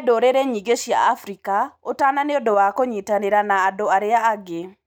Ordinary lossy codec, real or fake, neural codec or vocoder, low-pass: none; real; none; 19.8 kHz